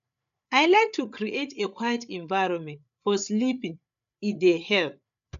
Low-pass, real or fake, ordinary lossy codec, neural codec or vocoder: 7.2 kHz; fake; none; codec, 16 kHz, 8 kbps, FreqCodec, larger model